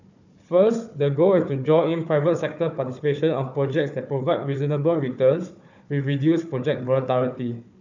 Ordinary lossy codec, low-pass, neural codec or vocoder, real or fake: none; 7.2 kHz; codec, 16 kHz, 4 kbps, FunCodec, trained on Chinese and English, 50 frames a second; fake